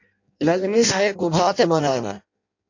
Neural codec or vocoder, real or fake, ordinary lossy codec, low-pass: codec, 16 kHz in and 24 kHz out, 0.6 kbps, FireRedTTS-2 codec; fake; AAC, 48 kbps; 7.2 kHz